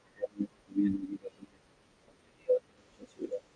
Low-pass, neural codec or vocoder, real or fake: 9.9 kHz; none; real